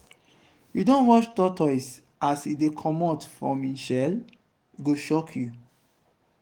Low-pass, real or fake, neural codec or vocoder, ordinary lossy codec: 19.8 kHz; fake; codec, 44.1 kHz, 7.8 kbps, DAC; Opus, 24 kbps